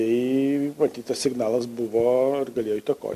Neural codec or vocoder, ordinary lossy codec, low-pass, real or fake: none; MP3, 64 kbps; 14.4 kHz; real